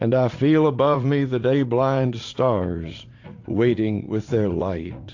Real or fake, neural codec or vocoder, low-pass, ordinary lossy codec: fake; vocoder, 44.1 kHz, 80 mel bands, Vocos; 7.2 kHz; AAC, 48 kbps